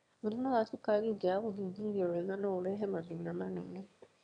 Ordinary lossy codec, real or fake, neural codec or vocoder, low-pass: none; fake; autoencoder, 22.05 kHz, a latent of 192 numbers a frame, VITS, trained on one speaker; 9.9 kHz